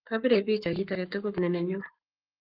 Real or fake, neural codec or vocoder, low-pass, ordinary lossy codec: fake; codec, 44.1 kHz, 7.8 kbps, Pupu-Codec; 5.4 kHz; Opus, 32 kbps